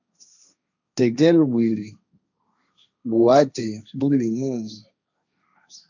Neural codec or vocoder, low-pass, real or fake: codec, 16 kHz, 1.1 kbps, Voila-Tokenizer; 7.2 kHz; fake